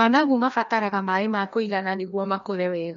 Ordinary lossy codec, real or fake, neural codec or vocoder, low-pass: MP3, 48 kbps; fake; codec, 16 kHz, 1 kbps, FreqCodec, larger model; 7.2 kHz